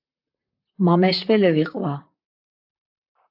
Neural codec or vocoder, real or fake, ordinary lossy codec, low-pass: vocoder, 44.1 kHz, 128 mel bands, Pupu-Vocoder; fake; AAC, 48 kbps; 5.4 kHz